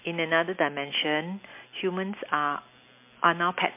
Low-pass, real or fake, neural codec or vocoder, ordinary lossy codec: 3.6 kHz; real; none; MP3, 24 kbps